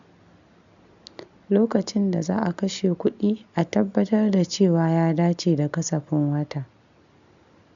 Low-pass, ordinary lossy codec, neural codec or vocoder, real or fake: 7.2 kHz; none; none; real